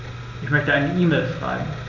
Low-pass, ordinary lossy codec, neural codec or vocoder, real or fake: 7.2 kHz; none; none; real